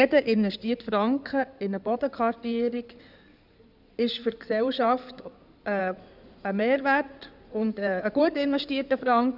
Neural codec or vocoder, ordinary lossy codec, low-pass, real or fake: codec, 16 kHz in and 24 kHz out, 2.2 kbps, FireRedTTS-2 codec; none; 5.4 kHz; fake